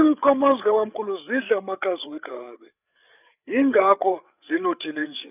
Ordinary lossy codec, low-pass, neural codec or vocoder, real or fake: none; 3.6 kHz; codec, 16 kHz, 8 kbps, FreqCodec, larger model; fake